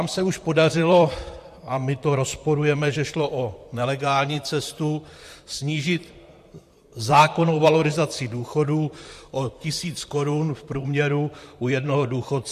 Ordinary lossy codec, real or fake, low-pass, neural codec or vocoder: MP3, 64 kbps; fake; 14.4 kHz; vocoder, 44.1 kHz, 128 mel bands, Pupu-Vocoder